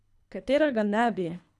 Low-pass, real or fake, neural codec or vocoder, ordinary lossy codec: none; fake; codec, 24 kHz, 3 kbps, HILCodec; none